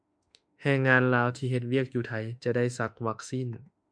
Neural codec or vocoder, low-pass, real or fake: autoencoder, 48 kHz, 32 numbers a frame, DAC-VAE, trained on Japanese speech; 9.9 kHz; fake